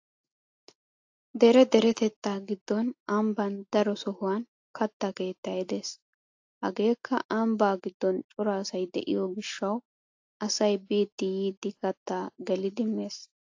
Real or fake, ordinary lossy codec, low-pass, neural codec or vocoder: real; AAC, 48 kbps; 7.2 kHz; none